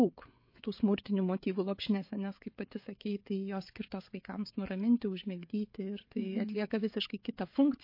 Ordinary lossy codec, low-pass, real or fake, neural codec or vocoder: MP3, 32 kbps; 5.4 kHz; fake; codec, 16 kHz, 16 kbps, FreqCodec, smaller model